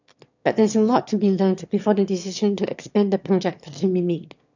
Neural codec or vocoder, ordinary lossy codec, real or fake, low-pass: autoencoder, 22.05 kHz, a latent of 192 numbers a frame, VITS, trained on one speaker; none; fake; 7.2 kHz